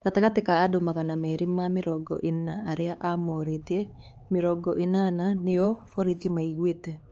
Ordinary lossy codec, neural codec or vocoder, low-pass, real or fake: Opus, 24 kbps; codec, 16 kHz, 4 kbps, X-Codec, HuBERT features, trained on LibriSpeech; 7.2 kHz; fake